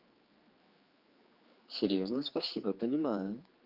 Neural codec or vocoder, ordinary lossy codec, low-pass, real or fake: codec, 16 kHz, 4 kbps, X-Codec, HuBERT features, trained on general audio; Opus, 32 kbps; 5.4 kHz; fake